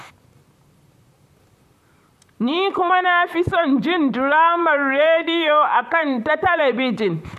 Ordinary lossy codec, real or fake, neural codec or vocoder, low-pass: none; fake; vocoder, 44.1 kHz, 128 mel bands, Pupu-Vocoder; 14.4 kHz